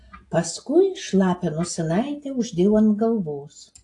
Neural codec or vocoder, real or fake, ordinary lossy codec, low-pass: none; real; AAC, 48 kbps; 10.8 kHz